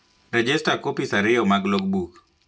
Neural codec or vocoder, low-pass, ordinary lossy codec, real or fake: none; none; none; real